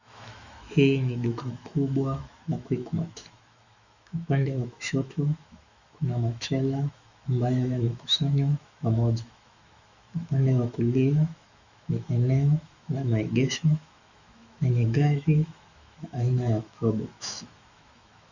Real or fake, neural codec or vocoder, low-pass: fake; autoencoder, 48 kHz, 128 numbers a frame, DAC-VAE, trained on Japanese speech; 7.2 kHz